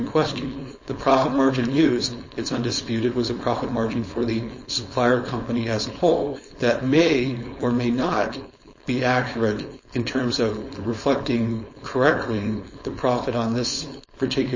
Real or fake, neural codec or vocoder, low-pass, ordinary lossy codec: fake; codec, 16 kHz, 4.8 kbps, FACodec; 7.2 kHz; MP3, 32 kbps